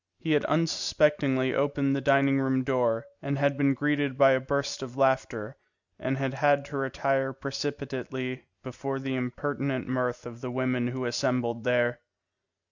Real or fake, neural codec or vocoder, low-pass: real; none; 7.2 kHz